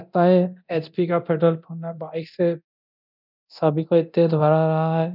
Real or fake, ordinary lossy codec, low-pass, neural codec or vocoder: fake; none; 5.4 kHz; codec, 24 kHz, 0.9 kbps, DualCodec